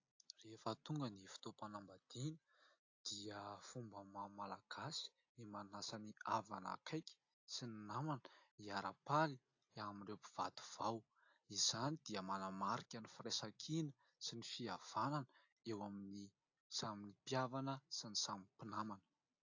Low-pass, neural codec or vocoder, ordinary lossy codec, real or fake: 7.2 kHz; none; AAC, 48 kbps; real